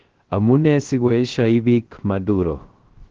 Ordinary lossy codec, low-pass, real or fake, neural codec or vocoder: Opus, 16 kbps; 7.2 kHz; fake; codec, 16 kHz, 0.3 kbps, FocalCodec